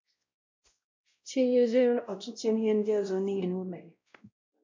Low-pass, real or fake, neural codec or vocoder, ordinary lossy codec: 7.2 kHz; fake; codec, 16 kHz, 0.5 kbps, X-Codec, WavLM features, trained on Multilingual LibriSpeech; MP3, 64 kbps